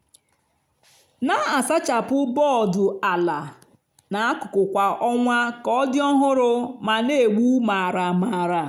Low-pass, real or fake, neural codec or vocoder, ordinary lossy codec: 19.8 kHz; real; none; none